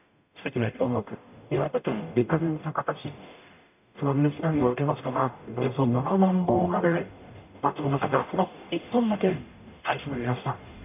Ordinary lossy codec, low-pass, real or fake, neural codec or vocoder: none; 3.6 kHz; fake; codec, 44.1 kHz, 0.9 kbps, DAC